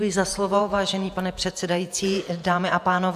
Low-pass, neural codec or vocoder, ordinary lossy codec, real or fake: 14.4 kHz; vocoder, 48 kHz, 128 mel bands, Vocos; AAC, 96 kbps; fake